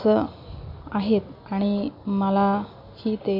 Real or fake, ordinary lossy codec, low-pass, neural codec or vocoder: real; none; 5.4 kHz; none